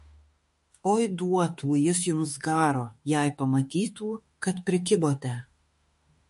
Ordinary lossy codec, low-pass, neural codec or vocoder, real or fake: MP3, 48 kbps; 14.4 kHz; autoencoder, 48 kHz, 32 numbers a frame, DAC-VAE, trained on Japanese speech; fake